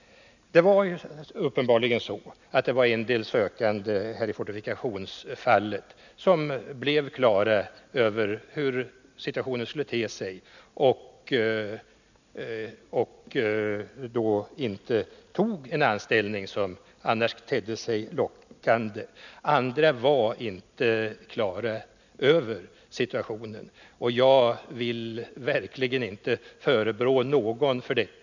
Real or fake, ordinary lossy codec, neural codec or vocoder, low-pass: real; none; none; 7.2 kHz